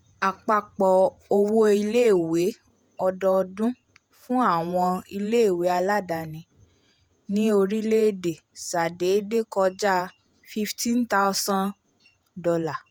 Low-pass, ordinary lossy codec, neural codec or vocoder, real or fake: none; none; vocoder, 48 kHz, 128 mel bands, Vocos; fake